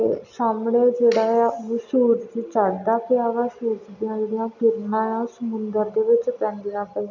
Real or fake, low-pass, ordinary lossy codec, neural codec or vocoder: real; 7.2 kHz; none; none